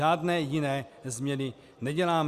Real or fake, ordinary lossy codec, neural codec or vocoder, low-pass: real; Opus, 64 kbps; none; 14.4 kHz